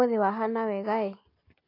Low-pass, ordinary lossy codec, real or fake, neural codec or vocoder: 5.4 kHz; AAC, 32 kbps; real; none